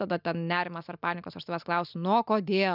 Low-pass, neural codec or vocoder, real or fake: 5.4 kHz; none; real